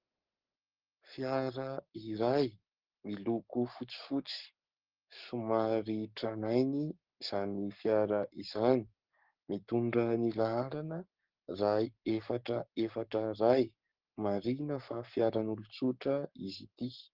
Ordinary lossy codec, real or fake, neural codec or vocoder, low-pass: Opus, 16 kbps; fake; codec, 44.1 kHz, 7.8 kbps, Pupu-Codec; 5.4 kHz